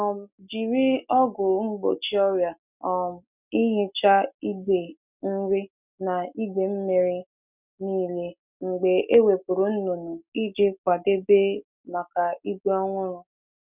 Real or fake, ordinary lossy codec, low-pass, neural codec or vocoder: real; none; 3.6 kHz; none